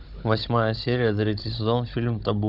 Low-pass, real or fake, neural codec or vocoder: 5.4 kHz; fake; codec, 16 kHz, 16 kbps, FunCodec, trained on Chinese and English, 50 frames a second